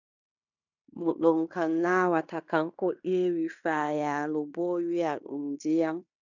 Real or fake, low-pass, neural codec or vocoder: fake; 7.2 kHz; codec, 16 kHz in and 24 kHz out, 0.9 kbps, LongCat-Audio-Codec, fine tuned four codebook decoder